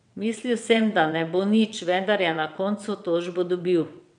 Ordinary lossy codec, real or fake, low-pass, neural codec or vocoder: none; fake; 9.9 kHz; vocoder, 22.05 kHz, 80 mel bands, WaveNeXt